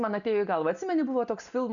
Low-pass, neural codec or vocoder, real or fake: 7.2 kHz; none; real